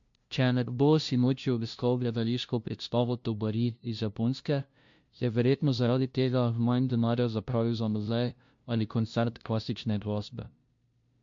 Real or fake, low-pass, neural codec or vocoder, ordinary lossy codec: fake; 7.2 kHz; codec, 16 kHz, 0.5 kbps, FunCodec, trained on LibriTTS, 25 frames a second; MP3, 48 kbps